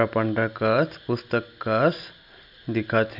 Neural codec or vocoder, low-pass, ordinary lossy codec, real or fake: none; 5.4 kHz; none; real